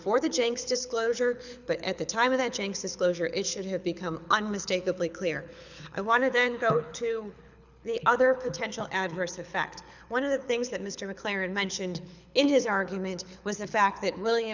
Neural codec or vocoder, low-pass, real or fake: codec, 24 kHz, 6 kbps, HILCodec; 7.2 kHz; fake